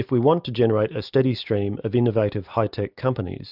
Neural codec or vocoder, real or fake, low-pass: none; real; 5.4 kHz